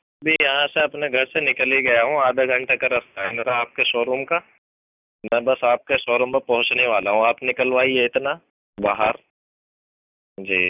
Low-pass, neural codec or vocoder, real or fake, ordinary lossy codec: 3.6 kHz; none; real; none